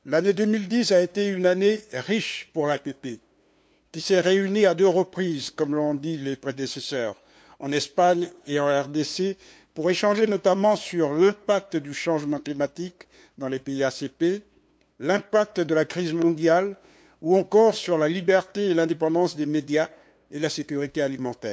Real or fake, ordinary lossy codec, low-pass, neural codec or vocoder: fake; none; none; codec, 16 kHz, 2 kbps, FunCodec, trained on LibriTTS, 25 frames a second